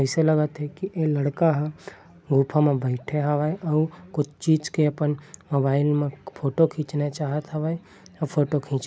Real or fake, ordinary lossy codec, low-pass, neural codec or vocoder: real; none; none; none